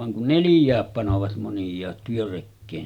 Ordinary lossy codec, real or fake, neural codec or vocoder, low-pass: none; real; none; 19.8 kHz